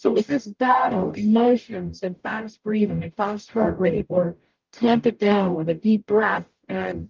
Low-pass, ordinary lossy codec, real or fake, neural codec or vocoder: 7.2 kHz; Opus, 32 kbps; fake; codec, 44.1 kHz, 0.9 kbps, DAC